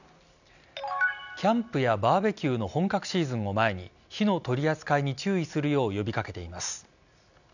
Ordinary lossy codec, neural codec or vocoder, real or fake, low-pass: none; none; real; 7.2 kHz